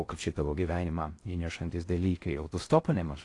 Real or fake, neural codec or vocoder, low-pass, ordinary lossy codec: fake; codec, 16 kHz in and 24 kHz out, 0.6 kbps, FocalCodec, streaming, 4096 codes; 10.8 kHz; AAC, 48 kbps